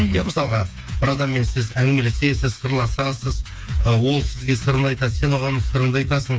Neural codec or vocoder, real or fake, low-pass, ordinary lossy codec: codec, 16 kHz, 4 kbps, FreqCodec, smaller model; fake; none; none